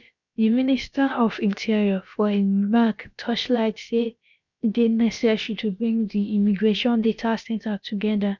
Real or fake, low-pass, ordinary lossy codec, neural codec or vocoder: fake; 7.2 kHz; none; codec, 16 kHz, about 1 kbps, DyCAST, with the encoder's durations